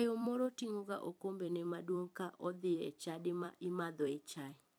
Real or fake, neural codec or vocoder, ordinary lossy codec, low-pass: fake; vocoder, 44.1 kHz, 128 mel bands every 256 samples, BigVGAN v2; none; none